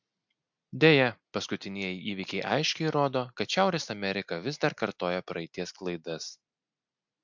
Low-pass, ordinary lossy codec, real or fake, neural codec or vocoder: 7.2 kHz; MP3, 64 kbps; real; none